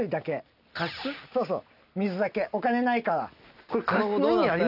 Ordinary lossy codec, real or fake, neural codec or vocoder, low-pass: none; real; none; 5.4 kHz